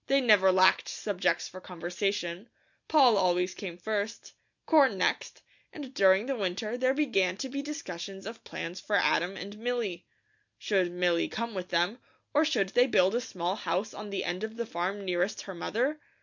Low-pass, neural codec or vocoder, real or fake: 7.2 kHz; none; real